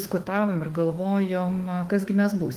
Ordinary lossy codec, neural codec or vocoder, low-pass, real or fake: Opus, 24 kbps; autoencoder, 48 kHz, 32 numbers a frame, DAC-VAE, trained on Japanese speech; 14.4 kHz; fake